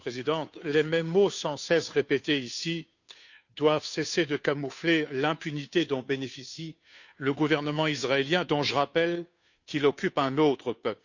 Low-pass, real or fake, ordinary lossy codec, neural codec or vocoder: 7.2 kHz; fake; none; codec, 16 kHz, 2 kbps, FunCodec, trained on Chinese and English, 25 frames a second